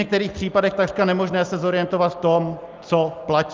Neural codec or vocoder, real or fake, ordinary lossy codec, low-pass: none; real; Opus, 24 kbps; 7.2 kHz